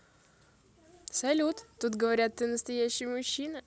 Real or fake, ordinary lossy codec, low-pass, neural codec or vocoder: real; none; none; none